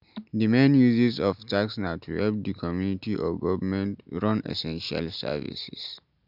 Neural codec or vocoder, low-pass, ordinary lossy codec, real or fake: none; 5.4 kHz; none; real